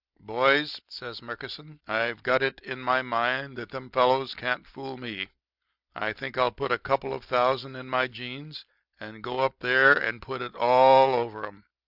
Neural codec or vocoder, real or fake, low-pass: none; real; 5.4 kHz